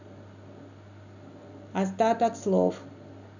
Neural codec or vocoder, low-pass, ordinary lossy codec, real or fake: none; 7.2 kHz; none; real